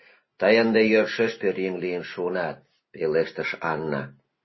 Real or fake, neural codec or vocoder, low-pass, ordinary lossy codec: real; none; 7.2 kHz; MP3, 24 kbps